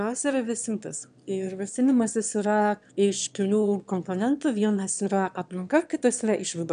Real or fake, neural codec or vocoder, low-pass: fake; autoencoder, 22.05 kHz, a latent of 192 numbers a frame, VITS, trained on one speaker; 9.9 kHz